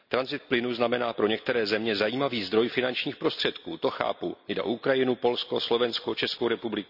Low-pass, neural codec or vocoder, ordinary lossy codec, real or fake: 5.4 kHz; none; none; real